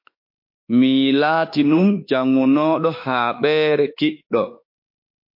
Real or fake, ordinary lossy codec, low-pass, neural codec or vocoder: fake; MP3, 32 kbps; 5.4 kHz; autoencoder, 48 kHz, 32 numbers a frame, DAC-VAE, trained on Japanese speech